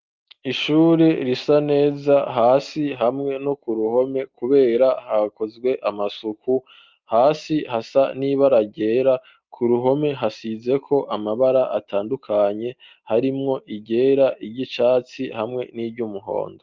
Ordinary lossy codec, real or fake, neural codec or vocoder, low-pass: Opus, 32 kbps; real; none; 7.2 kHz